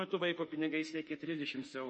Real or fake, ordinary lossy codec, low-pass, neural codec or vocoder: fake; MP3, 32 kbps; 10.8 kHz; autoencoder, 48 kHz, 32 numbers a frame, DAC-VAE, trained on Japanese speech